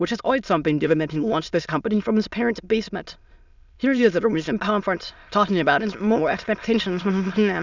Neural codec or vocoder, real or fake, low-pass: autoencoder, 22.05 kHz, a latent of 192 numbers a frame, VITS, trained on many speakers; fake; 7.2 kHz